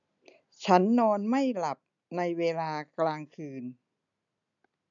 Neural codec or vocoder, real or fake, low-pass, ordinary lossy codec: none; real; 7.2 kHz; none